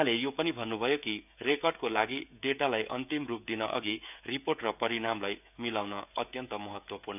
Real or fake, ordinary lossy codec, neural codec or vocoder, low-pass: fake; none; codec, 16 kHz, 16 kbps, FreqCodec, smaller model; 3.6 kHz